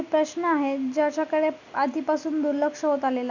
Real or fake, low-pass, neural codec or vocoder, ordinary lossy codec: real; 7.2 kHz; none; none